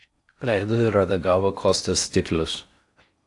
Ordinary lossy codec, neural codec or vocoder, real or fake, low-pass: AAC, 64 kbps; codec, 16 kHz in and 24 kHz out, 0.6 kbps, FocalCodec, streaming, 4096 codes; fake; 10.8 kHz